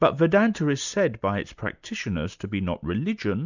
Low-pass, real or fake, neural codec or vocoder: 7.2 kHz; real; none